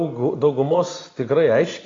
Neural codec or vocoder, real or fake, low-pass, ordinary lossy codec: none; real; 7.2 kHz; AAC, 32 kbps